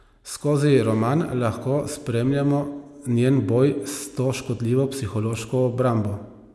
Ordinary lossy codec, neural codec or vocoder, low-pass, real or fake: none; none; none; real